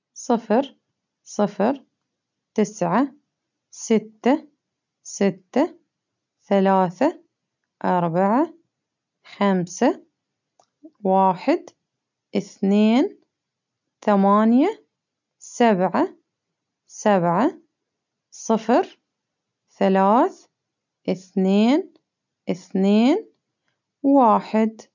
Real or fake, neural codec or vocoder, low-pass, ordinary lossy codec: real; none; 7.2 kHz; none